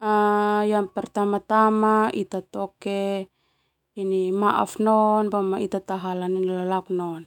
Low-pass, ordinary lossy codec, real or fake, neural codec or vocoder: 19.8 kHz; none; fake; autoencoder, 48 kHz, 128 numbers a frame, DAC-VAE, trained on Japanese speech